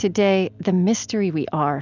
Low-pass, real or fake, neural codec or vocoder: 7.2 kHz; real; none